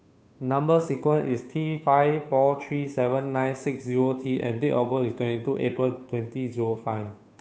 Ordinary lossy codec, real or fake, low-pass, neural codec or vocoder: none; fake; none; codec, 16 kHz, 2 kbps, FunCodec, trained on Chinese and English, 25 frames a second